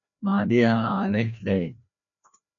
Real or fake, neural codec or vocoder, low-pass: fake; codec, 16 kHz, 1 kbps, FreqCodec, larger model; 7.2 kHz